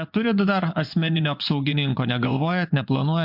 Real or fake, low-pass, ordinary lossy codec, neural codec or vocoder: fake; 5.4 kHz; MP3, 48 kbps; vocoder, 24 kHz, 100 mel bands, Vocos